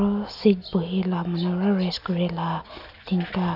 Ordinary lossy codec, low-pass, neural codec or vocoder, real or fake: none; 5.4 kHz; none; real